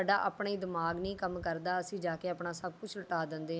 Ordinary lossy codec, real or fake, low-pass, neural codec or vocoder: none; real; none; none